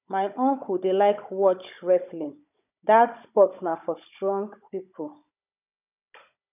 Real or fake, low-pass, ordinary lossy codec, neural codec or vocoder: fake; 3.6 kHz; none; codec, 16 kHz, 16 kbps, FunCodec, trained on Chinese and English, 50 frames a second